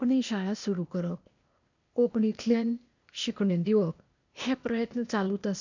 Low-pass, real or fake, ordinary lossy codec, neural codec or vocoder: 7.2 kHz; fake; none; codec, 16 kHz, 0.8 kbps, ZipCodec